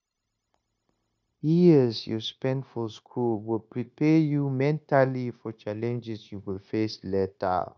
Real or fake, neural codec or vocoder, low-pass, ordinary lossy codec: fake; codec, 16 kHz, 0.9 kbps, LongCat-Audio-Codec; 7.2 kHz; none